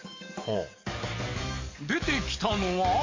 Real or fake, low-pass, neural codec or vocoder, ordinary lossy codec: fake; 7.2 kHz; codec, 44.1 kHz, 7.8 kbps, DAC; MP3, 48 kbps